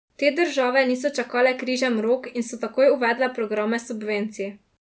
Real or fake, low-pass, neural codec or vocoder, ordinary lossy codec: real; none; none; none